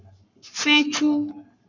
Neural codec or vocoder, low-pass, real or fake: codec, 44.1 kHz, 7.8 kbps, Pupu-Codec; 7.2 kHz; fake